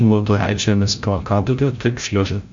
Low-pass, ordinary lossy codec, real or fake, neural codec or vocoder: 7.2 kHz; MP3, 48 kbps; fake; codec, 16 kHz, 0.5 kbps, FreqCodec, larger model